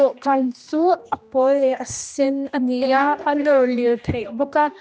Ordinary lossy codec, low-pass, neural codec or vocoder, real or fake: none; none; codec, 16 kHz, 1 kbps, X-Codec, HuBERT features, trained on general audio; fake